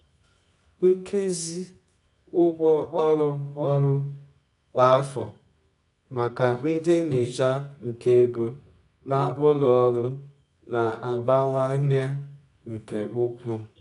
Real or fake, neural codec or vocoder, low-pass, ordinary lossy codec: fake; codec, 24 kHz, 0.9 kbps, WavTokenizer, medium music audio release; 10.8 kHz; none